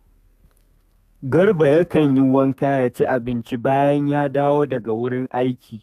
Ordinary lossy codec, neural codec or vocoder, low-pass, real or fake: none; codec, 32 kHz, 1.9 kbps, SNAC; 14.4 kHz; fake